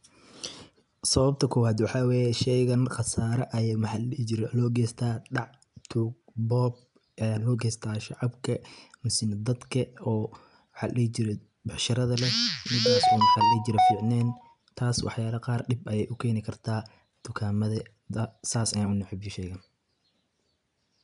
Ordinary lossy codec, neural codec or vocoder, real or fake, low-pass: none; none; real; 10.8 kHz